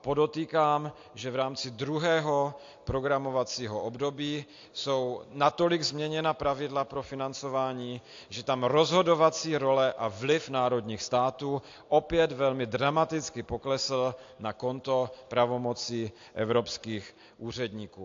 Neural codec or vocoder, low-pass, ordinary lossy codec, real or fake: none; 7.2 kHz; AAC, 48 kbps; real